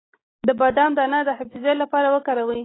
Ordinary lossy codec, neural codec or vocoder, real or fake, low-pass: AAC, 16 kbps; none; real; 7.2 kHz